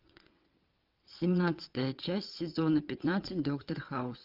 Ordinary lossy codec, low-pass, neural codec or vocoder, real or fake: Opus, 24 kbps; 5.4 kHz; vocoder, 22.05 kHz, 80 mel bands, WaveNeXt; fake